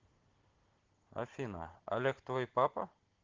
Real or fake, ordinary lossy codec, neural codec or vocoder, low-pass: real; Opus, 16 kbps; none; 7.2 kHz